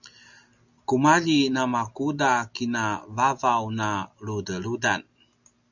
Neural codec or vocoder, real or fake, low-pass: none; real; 7.2 kHz